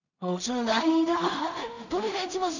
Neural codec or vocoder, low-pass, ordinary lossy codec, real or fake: codec, 16 kHz in and 24 kHz out, 0.4 kbps, LongCat-Audio-Codec, two codebook decoder; 7.2 kHz; none; fake